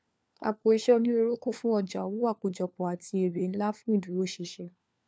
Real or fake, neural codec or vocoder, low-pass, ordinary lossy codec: fake; codec, 16 kHz, 4 kbps, FunCodec, trained on LibriTTS, 50 frames a second; none; none